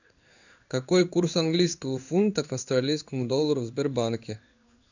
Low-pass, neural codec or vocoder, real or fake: 7.2 kHz; codec, 16 kHz in and 24 kHz out, 1 kbps, XY-Tokenizer; fake